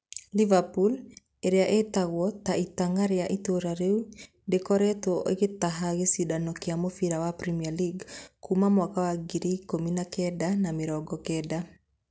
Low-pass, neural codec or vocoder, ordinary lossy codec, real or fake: none; none; none; real